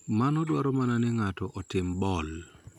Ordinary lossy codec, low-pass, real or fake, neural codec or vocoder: none; 19.8 kHz; real; none